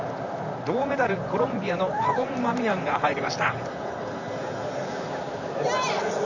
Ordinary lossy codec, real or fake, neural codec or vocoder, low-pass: none; fake; vocoder, 44.1 kHz, 128 mel bands, Pupu-Vocoder; 7.2 kHz